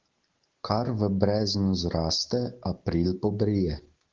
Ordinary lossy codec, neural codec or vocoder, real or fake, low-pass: Opus, 16 kbps; none; real; 7.2 kHz